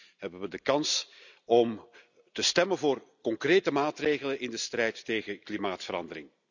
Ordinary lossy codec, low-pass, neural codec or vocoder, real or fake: none; 7.2 kHz; none; real